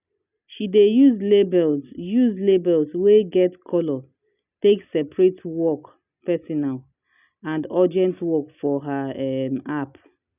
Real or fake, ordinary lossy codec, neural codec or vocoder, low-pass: real; none; none; 3.6 kHz